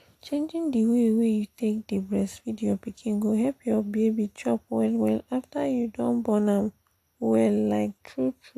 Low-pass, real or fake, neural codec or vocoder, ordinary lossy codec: 14.4 kHz; real; none; AAC, 64 kbps